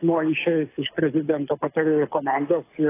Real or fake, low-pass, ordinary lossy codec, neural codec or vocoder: fake; 3.6 kHz; AAC, 24 kbps; codec, 24 kHz, 6 kbps, HILCodec